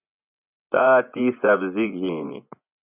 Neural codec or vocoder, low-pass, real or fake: vocoder, 24 kHz, 100 mel bands, Vocos; 3.6 kHz; fake